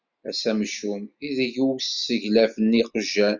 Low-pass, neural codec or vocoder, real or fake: 7.2 kHz; none; real